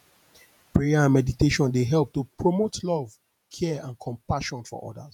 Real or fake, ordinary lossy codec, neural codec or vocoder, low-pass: fake; none; vocoder, 48 kHz, 128 mel bands, Vocos; 19.8 kHz